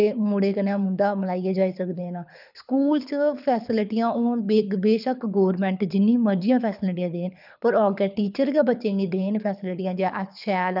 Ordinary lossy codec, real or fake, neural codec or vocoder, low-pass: none; fake; codec, 24 kHz, 6 kbps, HILCodec; 5.4 kHz